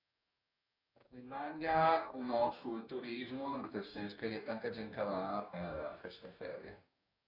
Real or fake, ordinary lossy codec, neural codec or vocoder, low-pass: fake; none; codec, 44.1 kHz, 2.6 kbps, DAC; 5.4 kHz